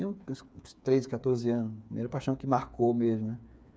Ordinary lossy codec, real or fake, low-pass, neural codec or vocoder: none; fake; none; codec, 16 kHz, 8 kbps, FreqCodec, smaller model